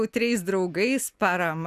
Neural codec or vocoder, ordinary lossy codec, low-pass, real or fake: none; Opus, 64 kbps; 14.4 kHz; real